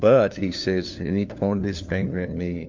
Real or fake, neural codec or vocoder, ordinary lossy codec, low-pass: fake; codec, 16 kHz, 4 kbps, FunCodec, trained on LibriTTS, 50 frames a second; MP3, 48 kbps; 7.2 kHz